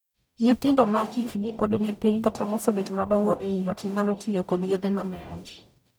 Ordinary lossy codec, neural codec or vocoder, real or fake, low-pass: none; codec, 44.1 kHz, 0.9 kbps, DAC; fake; none